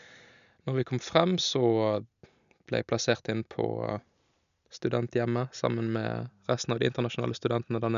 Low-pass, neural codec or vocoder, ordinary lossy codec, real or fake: 7.2 kHz; none; none; real